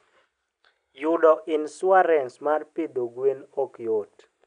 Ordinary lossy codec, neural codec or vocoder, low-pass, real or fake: none; none; 9.9 kHz; real